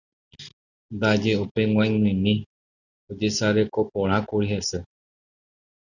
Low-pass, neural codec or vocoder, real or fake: 7.2 kHz; none; real